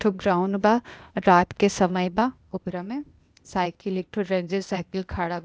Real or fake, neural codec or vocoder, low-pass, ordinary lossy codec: fake; codec, 16 kHz, 0.8 kbps, ZipCodec; none; none